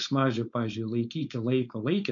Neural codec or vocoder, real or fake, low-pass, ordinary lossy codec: codec, 16 kHz, 4.8 kbps, FACodec; fake; 7.2 kHz; MP3, 48 kbps